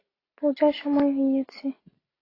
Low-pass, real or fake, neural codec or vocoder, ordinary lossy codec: 5.4 kHz; real; none; AAC, 24 kbps